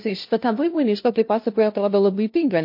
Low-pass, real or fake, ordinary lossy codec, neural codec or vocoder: 5.4 kHz; fake; MP3, 32 kbps; codec, 16 kHz, 0.5 kbps, FunCodec, trained on LibriTTS, 25 frames a second